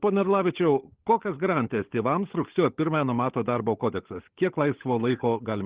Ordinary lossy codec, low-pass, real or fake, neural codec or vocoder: Opus, 16 kbps; 3.6 kHz; fake; codec, 16 kHz, 16 kbps, FunCodec, trained on LibriTTS, 50 frames a second